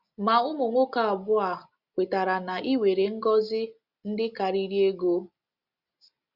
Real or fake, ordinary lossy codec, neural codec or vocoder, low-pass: real; Opus, 64 kbps; none; 5.4 kHz